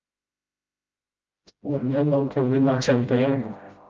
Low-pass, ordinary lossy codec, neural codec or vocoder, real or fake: 7.2 kHz; Opus, 24 kbps; codec, 16 kHz, 0.5 kbps, FreqCodec, smaller model; fake